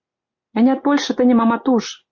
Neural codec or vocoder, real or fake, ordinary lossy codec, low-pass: none; real; MP3, 48 kbps; 7.2 kHz